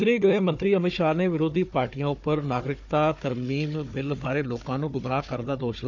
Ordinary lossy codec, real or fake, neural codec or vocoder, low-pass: none; fake; codec, 16 kHz, 4 kbps, FunCodec, trained on Chinese and English, 50 frames a second; 7.2 kHz